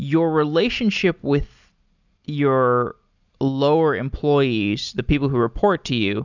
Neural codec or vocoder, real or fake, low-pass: none; real; 7.2 kHz